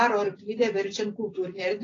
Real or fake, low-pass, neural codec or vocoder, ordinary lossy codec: real; 7.2 kHz; none; AAC, 32 kbps